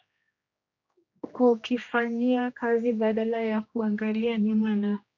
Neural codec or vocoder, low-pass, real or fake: codec, 16 kHz, 1 kbps, X-Codec, HuBERT features, trained on general audio; 7.2 kHz; fake